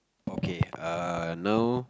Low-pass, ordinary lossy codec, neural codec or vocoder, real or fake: none; none; none; real